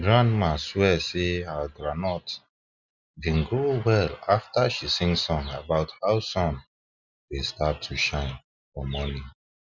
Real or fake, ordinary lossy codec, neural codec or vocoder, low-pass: real; none; none; 7.2 kHz